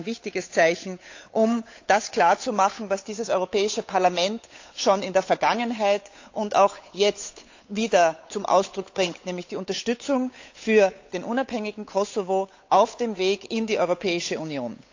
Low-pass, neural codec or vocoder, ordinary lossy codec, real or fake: 7.2 kHz; codec, 16 kHz, 8 kbps, FunCodec, trained on Chinese and English, 25 frames a second; AAC, 48 kbps; fake